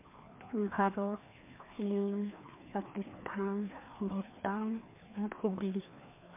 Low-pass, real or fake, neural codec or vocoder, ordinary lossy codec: 3.6 kHz; fake; codec, 16 kHz, 2 kbps, FreqCodec, larger model; MP3, 24 kbps